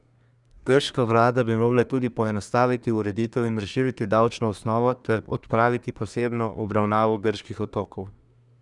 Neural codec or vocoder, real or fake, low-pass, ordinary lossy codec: codec, 24 kHz, 1 kbps, SNAC; fake; 10.8 kHz; none